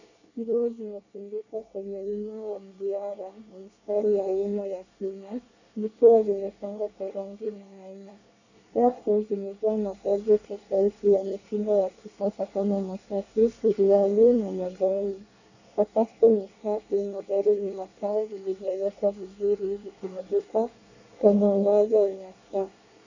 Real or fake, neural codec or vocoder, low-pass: fake; codec, 24 kHz, 1 kbps, SNAC; 7.2 kHz